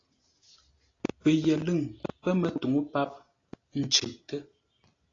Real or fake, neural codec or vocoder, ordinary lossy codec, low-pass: real; none; AAC, 32 kbps; 7.2 kHz